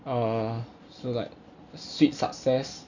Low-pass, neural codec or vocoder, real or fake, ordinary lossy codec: 7.2 kHz; none; real; none